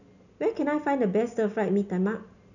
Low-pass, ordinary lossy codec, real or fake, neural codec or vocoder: 7.2 kHz; none; real; none